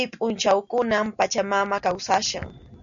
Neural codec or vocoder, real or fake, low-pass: none; real; 7.2 kHz